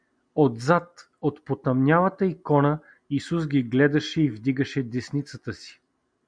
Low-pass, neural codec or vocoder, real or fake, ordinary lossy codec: 9.9 kHz; none; real; MP3, 96 kbps